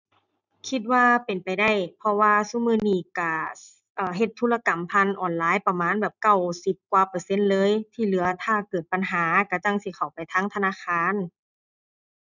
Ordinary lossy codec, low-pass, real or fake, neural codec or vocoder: none; 7.2 kHz; real; none